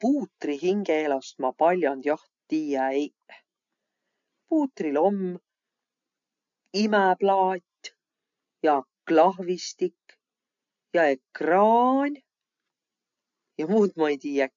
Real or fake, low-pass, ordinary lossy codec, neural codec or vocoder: real; 7.2 kHz; MP3, 64 kbps; none